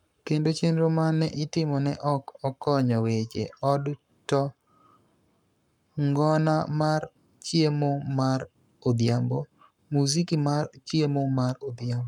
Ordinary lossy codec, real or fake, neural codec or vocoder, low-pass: none; fake; codec, 44.1 kHz, 7.8 kbps, Pupu-Codec; 19.8 kHz